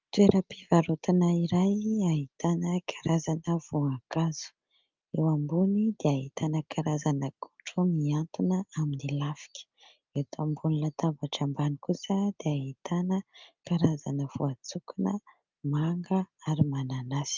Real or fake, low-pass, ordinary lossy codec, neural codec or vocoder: real; 7.2 kHz; Opus, 24 kbps; none